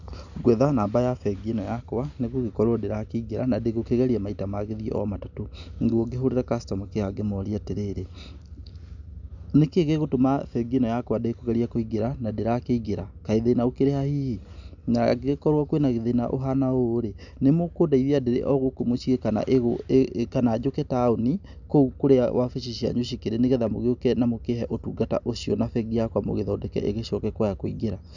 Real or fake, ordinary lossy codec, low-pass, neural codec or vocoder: real; none; 7.2 kHz; none